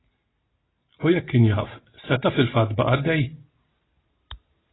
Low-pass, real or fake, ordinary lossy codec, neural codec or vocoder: 7.2 kHz; real; AAC, 16 kbps; none